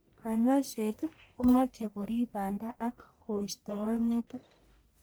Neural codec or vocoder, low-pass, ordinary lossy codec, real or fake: codec, 44.1 kHz, 1.7 kbps, Pupu-Codec; none; none; fake